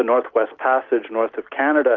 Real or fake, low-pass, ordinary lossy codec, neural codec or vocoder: real; 7.2 kHz; Opus, 24 kbps; none